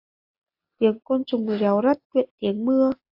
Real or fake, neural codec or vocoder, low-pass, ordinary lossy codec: real; none; 5.4 kHz; AAC, 48 kbps